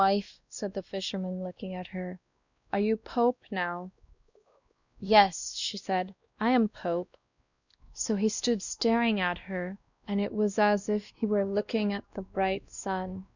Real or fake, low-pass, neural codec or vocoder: fake; 7.2 kHz; codec, 16 kHz, 1 kbps, X-Codec, WavLM features, trained on Multilingual LibriSpeech